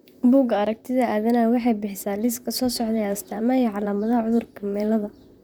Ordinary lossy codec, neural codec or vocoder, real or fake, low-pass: none; vocoder, 44.1 kHz, 128 mel bands, Pupu-Vocoder; fake; none